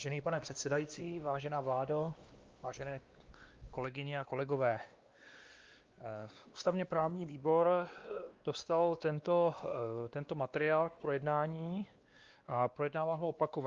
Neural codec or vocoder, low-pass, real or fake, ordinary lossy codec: codec, 16 kHz, 2 kbps, X-Codec, WavLM features, trained on Multilingual LibriSpeech; 7.2 kHz; fake; Opus, 32 kbps